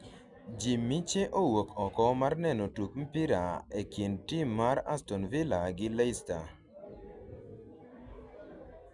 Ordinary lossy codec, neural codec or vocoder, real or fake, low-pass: MP3, 96 kbps; none; real; 10.8 kHz